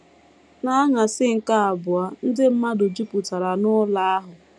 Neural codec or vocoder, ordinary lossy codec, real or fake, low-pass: none; none; real; none